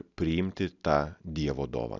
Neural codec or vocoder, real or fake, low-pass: none; real; 7.2 kHz